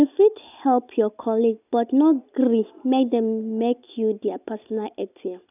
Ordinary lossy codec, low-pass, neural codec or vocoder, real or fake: none; 3.6 kHz; none; real